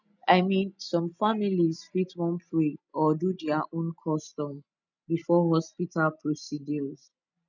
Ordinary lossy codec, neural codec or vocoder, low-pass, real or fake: none; none; 7.2 kHz; real